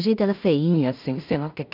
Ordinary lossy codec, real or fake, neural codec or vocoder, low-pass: none; fake; codec, 16 kHz in and 24 kHz out, 0.4 kbps, LongCat-Audio-Codec, two codebook decoder; 5.4 kHz